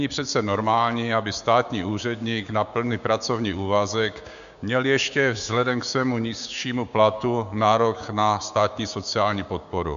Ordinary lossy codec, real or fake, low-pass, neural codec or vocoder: AAC, 96 kbps; fake; 7.2 kHz; codec, 16 kHz, 6 kbps, DAC